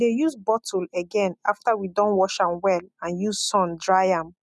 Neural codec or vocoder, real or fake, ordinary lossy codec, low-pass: none; real; none; none